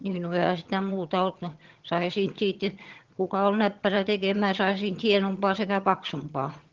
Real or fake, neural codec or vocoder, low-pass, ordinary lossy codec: fake; vocoder, 22.05 kHz, 80 mel bands, HiFi-GAN; 7.2 kHz; Opus, 16 kbps